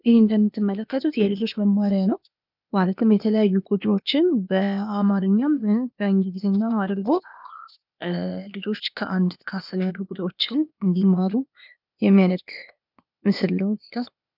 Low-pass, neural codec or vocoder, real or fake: 5.4 kHz; codec, 16 kHz, 0.8 kbps, ZipCodec; fake